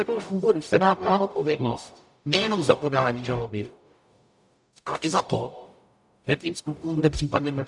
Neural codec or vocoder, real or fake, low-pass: codec, 44.1 kHz, 0.9 kbps, DAC; fake; 10.8 kHz